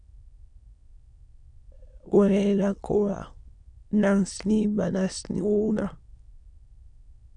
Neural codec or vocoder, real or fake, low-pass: autoencoder, 22.05 kHz, a latent of 192 numbers a frame, VITS, trained on many speakers; fake; 9.9 kHz